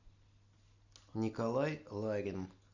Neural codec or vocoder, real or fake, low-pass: none; real; 7.2 kHz